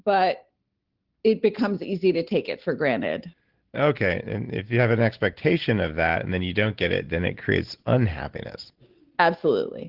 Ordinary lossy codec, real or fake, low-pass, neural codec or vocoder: Opus, 16 kbps; real; 5.4 kHz; none